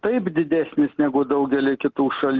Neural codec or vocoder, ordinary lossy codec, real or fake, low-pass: none; Opus, 16 kbps; real; 7.2 kHz